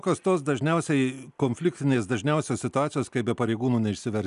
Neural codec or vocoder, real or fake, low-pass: none; real; 10.8 kHz